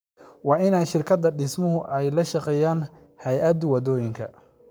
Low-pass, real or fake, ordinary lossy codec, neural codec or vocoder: none; fake; none; codec, 44.1 kHz, 7.8 kbps, Pupu-Codec